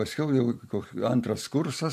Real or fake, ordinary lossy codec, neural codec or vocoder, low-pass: fake; MP3, 64 kbps; vocoder, 44.1 kHz, 128 mel bands every 512 samples, BigVGAN v2; 14.4 kHz